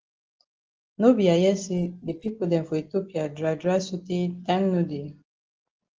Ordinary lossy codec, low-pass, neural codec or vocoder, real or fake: Opus, 16 kbps; 7.2 kHz; none; real